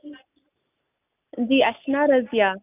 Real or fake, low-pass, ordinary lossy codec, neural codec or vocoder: real; 3.6 kHz; none; none